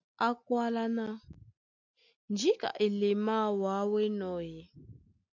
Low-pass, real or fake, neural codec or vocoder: 7.2 kHz; real; none